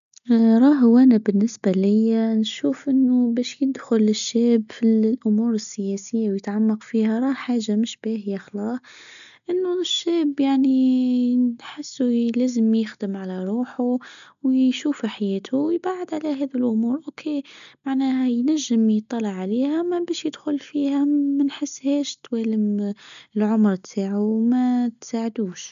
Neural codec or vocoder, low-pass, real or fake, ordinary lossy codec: none; 7.2 kHz; real; none